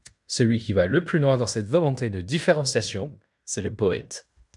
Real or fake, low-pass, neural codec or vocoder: fake; 10.8 kHz; codec, 16 kHz in and 24 kHz out, 0.9 kbps, LongCat-Audio-Codec, fine tuned four codebook decoder